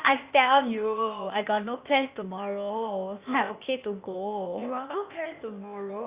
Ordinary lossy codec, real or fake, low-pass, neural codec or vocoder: Opus, 64 kbps; fake; 3.6 kHz; codec, 16 kHz, 0.8 kbps, ZipCodec